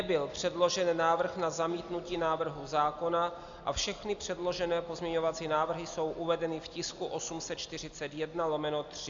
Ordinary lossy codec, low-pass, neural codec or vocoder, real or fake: AAC, 64 kbps; 7.2 kHz; none; real